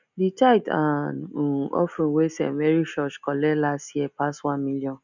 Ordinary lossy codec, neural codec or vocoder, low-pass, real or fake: none; none; 7.2 kHz; real